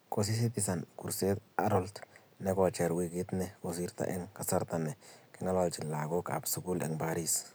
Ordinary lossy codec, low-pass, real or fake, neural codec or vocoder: none; none; real; none